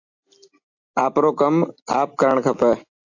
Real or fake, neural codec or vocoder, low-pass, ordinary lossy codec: real; none; 7.2 kHz; AAC, 48 kbps